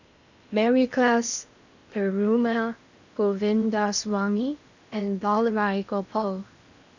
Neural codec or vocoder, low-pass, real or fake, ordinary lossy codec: codec, 16 kHz in and 24 kHz out, 0.8 kbps, FocalCodec, streaming, 65536 codes; 7.2 kHz; fake; none